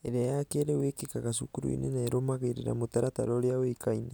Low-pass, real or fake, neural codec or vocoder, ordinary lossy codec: none; real; none; none